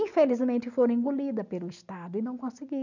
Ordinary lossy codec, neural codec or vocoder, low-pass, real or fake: none; none; 7.2 kHz; real